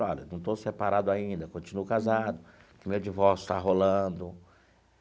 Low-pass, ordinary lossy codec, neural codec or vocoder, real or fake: none; none; none; real